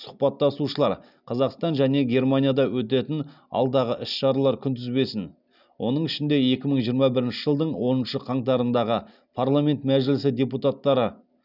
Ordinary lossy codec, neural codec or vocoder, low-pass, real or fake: none; none; 5.4 kHz; real